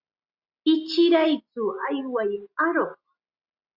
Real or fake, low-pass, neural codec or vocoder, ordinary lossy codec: real; 5.4 kHz; none; Opus, 64 kbps